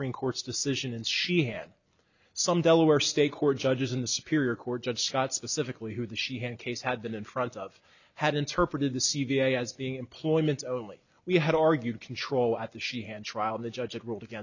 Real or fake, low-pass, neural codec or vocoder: real; 7.2 kHz; none